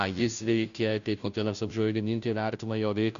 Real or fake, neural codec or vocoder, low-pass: fake; codec, 16 kHz, 0.5 kbps, FunCodec, trained on Chinese and English, 25 frames a second; 7.2 kHz